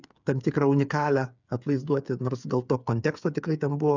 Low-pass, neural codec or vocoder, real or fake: 7.2 kHz; codec, 16 kHz, 8 kbps, FreqCodec, smaller model; fake